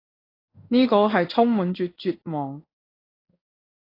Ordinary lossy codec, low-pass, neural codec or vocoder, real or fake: AAC, 24 kbps; 5.4 kHz; codec, 16 kHz in and 24 kHz out, 1 kbps, XY-Tokenizer; fake